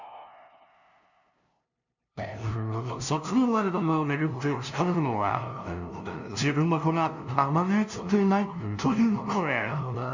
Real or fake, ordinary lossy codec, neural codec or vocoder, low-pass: fake; none; codec, 16 kHz, 0.5 kbps, FunCodec, trained on LibriTTS, 25 frames a second; 7.2 kHz